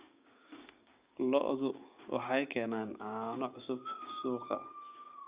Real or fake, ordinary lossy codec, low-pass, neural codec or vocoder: fake; Opus, 64 kbps; 3.6 kHz; codec, 16 kHz, 6 kbps, DAC